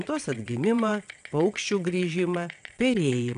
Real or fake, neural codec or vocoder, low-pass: fake; vocoder, 22.05 kHz, 80 mel bands, WaveNeXt; 9.9 kHz